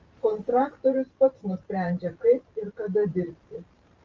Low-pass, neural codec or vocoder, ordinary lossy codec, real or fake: 7.2 kHz; vocoder, 44.1 kHz, 128 mel bands every 512 samples, BigVGAN v2; Opus, 32 kbps; fake